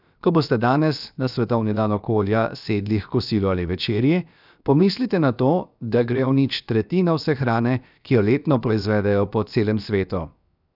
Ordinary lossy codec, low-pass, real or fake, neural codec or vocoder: none; 5.4 kHz; fake; codec, 16 kHz, 0.7 kbps, FocalCodec